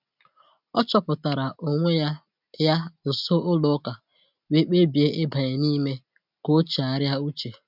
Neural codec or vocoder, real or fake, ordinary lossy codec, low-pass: none; real; none; 5.4 kHz